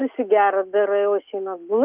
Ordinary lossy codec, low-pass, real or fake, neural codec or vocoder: Opus, 24 kbps; 3.6 kHz; real; none